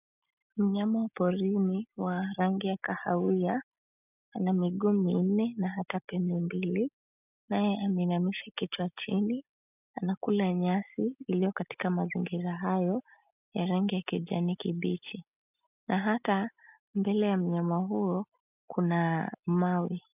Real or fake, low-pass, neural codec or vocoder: real; 3.6 kHz; none